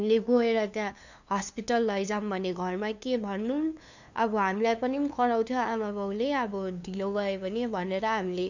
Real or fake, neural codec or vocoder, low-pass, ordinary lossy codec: fake; codec, 16 kHz, 2 kbps, FunCodec, trained on LibriTTS, 25 frames a second; 7.2 kHz; none